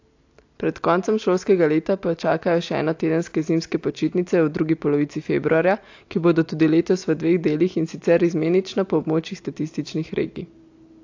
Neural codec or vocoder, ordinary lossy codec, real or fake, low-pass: none; AAC, 48 kbps; real; 7.2 kHz